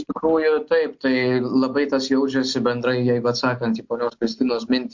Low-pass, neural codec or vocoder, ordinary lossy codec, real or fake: 7.2 kHz; none; MP3, 48 kbps; real